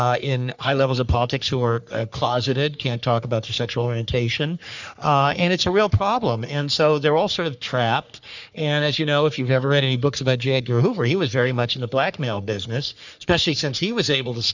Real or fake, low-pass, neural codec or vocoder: fake; 7.2 kHz; codec, 44.1 kHz, 3.4 kbps, Pupu-Codec